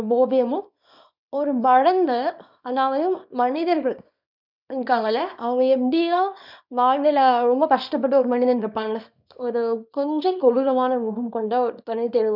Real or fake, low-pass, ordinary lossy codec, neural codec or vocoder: fake; 5.4 kHz; none; codec, 24 kHz, 0.9 kbps, WavTokenizer, small release